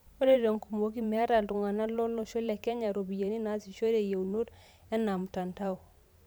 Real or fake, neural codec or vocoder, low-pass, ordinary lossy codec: fake; vocoder, 44.1 kHz, 128 mel bands every 512 samples, BigVGAN v2; none; none